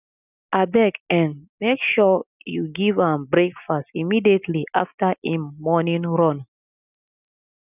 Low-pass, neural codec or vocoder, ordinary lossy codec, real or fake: 3.6 kHz; none; none; real